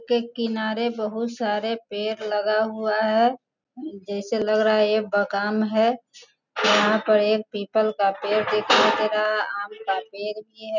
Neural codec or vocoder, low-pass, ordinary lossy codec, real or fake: none; 7.2 kHz; none; real